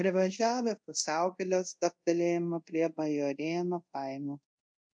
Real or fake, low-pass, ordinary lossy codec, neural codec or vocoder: fake; 9.9 kHz; MP3, 64 kbps; codec, 24 kHz, 0.5 kbps, DualCodec